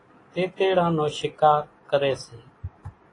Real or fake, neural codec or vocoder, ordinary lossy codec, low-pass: real; none; AAC, 32 kbps; 10.8 kHz